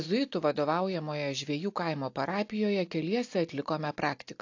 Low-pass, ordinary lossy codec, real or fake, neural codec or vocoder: 7.2 kHz; AAC, 48 kbps; real; none